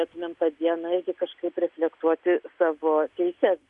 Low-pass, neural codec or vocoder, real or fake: 10.8 kHz; none; real